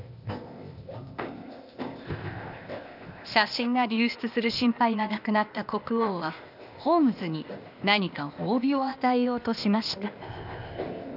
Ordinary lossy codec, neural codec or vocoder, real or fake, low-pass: none; codec, 16 kHz, 0.8 kbps, ZipCodec; fake; 5.4 kHz